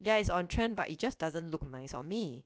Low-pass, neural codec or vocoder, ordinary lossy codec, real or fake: none; codec, 16 kHz, about 1 kbps, DyCAST, with the encoder's durations; none; fake